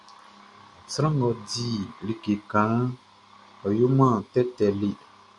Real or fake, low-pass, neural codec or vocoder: real; 10.8 kHz; none